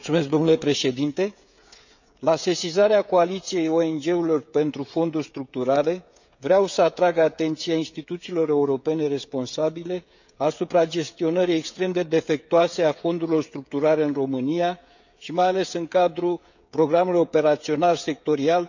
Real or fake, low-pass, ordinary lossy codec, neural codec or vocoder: fake; 7.2 kHz; none; codec, 16 kHz, 16 kbps, FreqCodec, smaller model